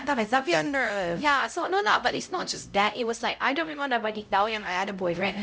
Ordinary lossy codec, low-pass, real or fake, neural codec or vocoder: none; none; fake; codec, 16 kHz, 0.5 kbps, X-Codec, HuBERT features, trained on LibriSpeech